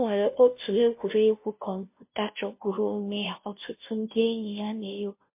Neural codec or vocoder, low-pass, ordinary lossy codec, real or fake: codec, 16 kHz, 0.5 kbps, FunCodec, trained on Chinese and English, 25 frames a second; 3.6 kHz; MP3, 24 kbps; fake